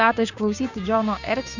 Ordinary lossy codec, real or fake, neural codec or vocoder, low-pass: Opus, 64 kbps; fake; codec, 16 kHz, 6 kbps, DAC; 7.2 kHz